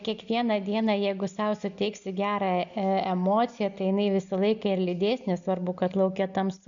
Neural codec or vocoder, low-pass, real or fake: none; 7.2 kHz; real